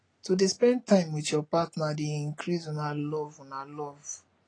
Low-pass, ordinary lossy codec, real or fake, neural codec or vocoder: 9.9 kHz; AAC, 32 kbps; real; none